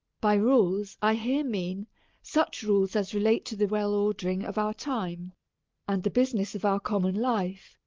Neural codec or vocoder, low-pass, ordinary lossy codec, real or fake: none; 7.2 kHz; Opus, 32 kbps; real